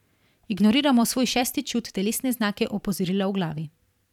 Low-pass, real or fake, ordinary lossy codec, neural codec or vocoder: 19.8 kHz; fake; none; vocoder, 44.1 kHz, 128 mel bands every 512 samples, BigVGAN v2